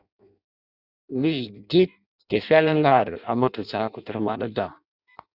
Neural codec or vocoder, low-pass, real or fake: codec, 16 kHz in and 24 kHz out, 0.6 kbps, FireRedTTS-2 codec; 5.4 kHz; fake